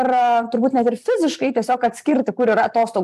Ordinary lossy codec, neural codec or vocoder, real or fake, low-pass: AAC, 96 kbps; vocoder, 44.1 kHz, 128 mel bands every 256 samples, BigVGAN v2; fake; 14.4 kHz